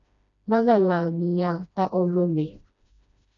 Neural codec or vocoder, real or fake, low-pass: codec, 16 kHz, 1 kbps, FreqCodec, smaller model; fake; 7.2 kHz